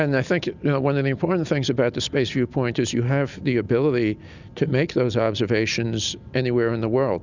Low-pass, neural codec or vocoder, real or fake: 7.2 kHz; none; real